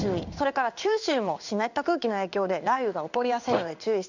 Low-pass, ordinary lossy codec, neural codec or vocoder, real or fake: 7.2 kHz; none; codec, 16 kHz in and 24 kHz out, 1 kbps, XY-Tokenizer; fake